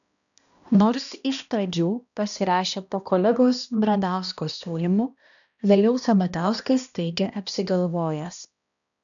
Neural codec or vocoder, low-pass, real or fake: codec, 16 kHz, 1 kbps, X-Codec, HuBERT features, trained on balanced general audio; 7.2 kHz; fake